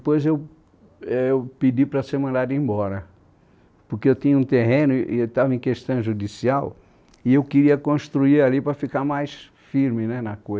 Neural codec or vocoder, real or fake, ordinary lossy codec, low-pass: none; real; none; none